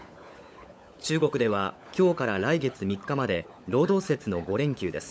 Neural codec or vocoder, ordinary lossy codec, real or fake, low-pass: codec, 16 kHz, 16 kbps, FunCodec, trained on LibriTTS, 50 frames a second; none; fake; none